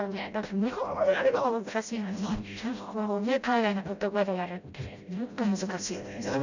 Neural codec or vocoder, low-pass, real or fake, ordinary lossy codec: codec, 16 kHz, 0.5 kbps, FreqCodec, smaller model; 7.2 kHz; fake; none